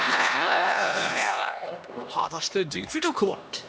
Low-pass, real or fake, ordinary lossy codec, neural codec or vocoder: none; fake; none; codec, 16 kHz, 1 kbps, X-Codec, HuBERT features, trained on LibriSpeech